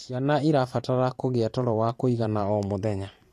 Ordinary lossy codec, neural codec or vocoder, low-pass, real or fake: AAC, 48 kbps; vocoder, 44.1 kHz, 128 mel bands every 512 samples, BigVGAN v2; 14.4 kHz; fake